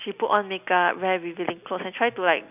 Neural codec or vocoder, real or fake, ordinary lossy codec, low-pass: none; real; none; 3.6 kHz